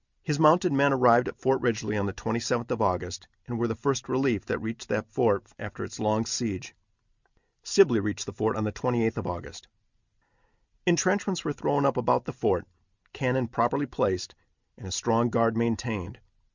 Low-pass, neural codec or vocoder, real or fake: 7.2 kHz; none; real